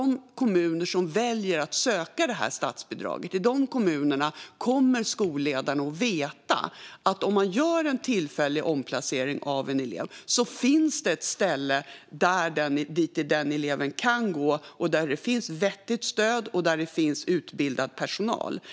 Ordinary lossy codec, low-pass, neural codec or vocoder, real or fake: none; none; none; real